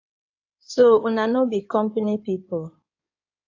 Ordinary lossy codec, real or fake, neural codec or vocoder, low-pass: AAC, 48 kbps; fake; codec, 16 kHz in and 24 kHz out, 2.2 kbps, FireRedTTS-2 codec; 7.2 kHz